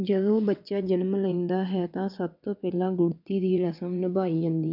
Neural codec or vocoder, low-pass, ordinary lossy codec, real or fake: vocoder, 22.05 kHz, 80 mel bands, WaveNeXt; 5.4 kHz; none; fake